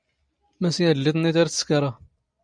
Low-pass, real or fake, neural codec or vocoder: 9.9 kHz; real; none